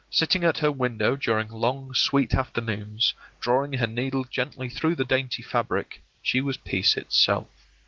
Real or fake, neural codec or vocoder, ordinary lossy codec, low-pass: real; none; Opus, 16 kbps; 7.2 kHz